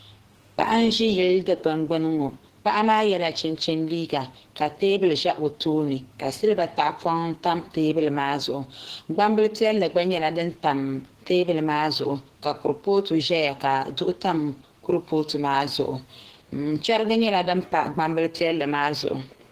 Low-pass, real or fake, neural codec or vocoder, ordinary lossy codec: 14.4 kHz; fake; codec, 44.1 kHz, 2.6 kbps, SNAC; Opus, 16 kbps